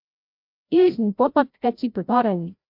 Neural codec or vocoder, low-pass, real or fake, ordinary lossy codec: codec, 16 kHz, 0.5 kbps, FreqCodec, larger model; 5.4 kHz; fake; MP3, 48 kbps